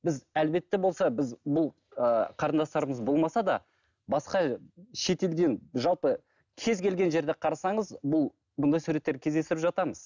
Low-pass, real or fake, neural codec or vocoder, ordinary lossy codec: 7.2 kHz; real; none; none